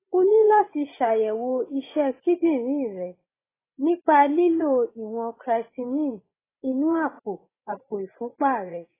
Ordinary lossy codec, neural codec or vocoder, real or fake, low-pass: AAC, 16 kbps; vocoder, 24 kHz, 100 mel bands, Vocos; fake; 3.6 kHz